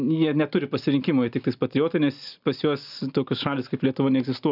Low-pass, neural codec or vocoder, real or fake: 5.4 kHz; none; real